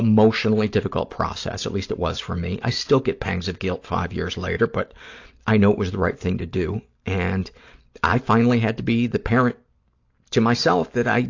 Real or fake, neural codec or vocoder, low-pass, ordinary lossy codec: real; none; 7.2 kHz; AAC, 48 kbps